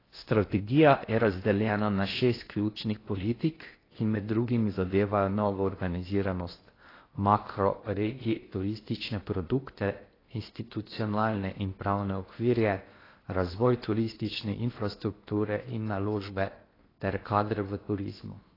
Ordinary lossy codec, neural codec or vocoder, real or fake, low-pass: AAC, 24 kbps; codec, 16 kHz in and 24 kHz out, 0.6 kbps, FocalCodec, streaming, 4096 codes; fake; 5.4 kHz